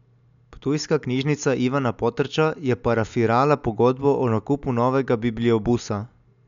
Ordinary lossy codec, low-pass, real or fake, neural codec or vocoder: none; 7.2 kHz; real; none